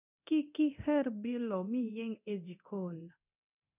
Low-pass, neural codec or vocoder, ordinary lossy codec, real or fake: 3.6 kHz; codec, 24 kHz, 0.9 kbps, DualCodec; none; fake